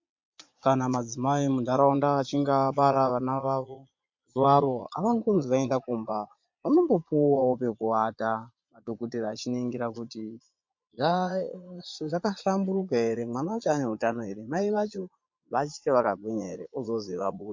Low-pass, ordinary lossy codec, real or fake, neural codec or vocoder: 7.2 kHz; MP3, 48 kbps; fake; vocoder, 22.05 kHz, 80 mel bands, Vocos